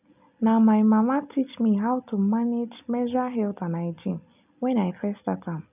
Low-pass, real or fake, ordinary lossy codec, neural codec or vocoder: 3.6 kHz; real; none; none